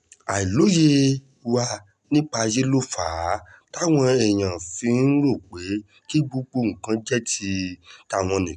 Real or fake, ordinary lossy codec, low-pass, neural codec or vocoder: real; none; 10.8 kHz; none